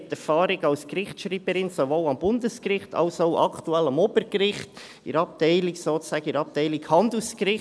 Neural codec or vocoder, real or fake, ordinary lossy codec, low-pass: none; real; none; none